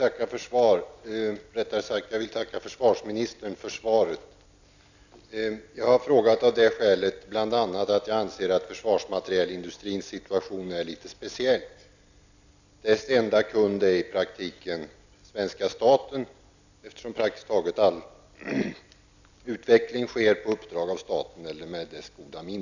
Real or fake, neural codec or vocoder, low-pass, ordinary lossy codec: real; none; 7.2 kHz; none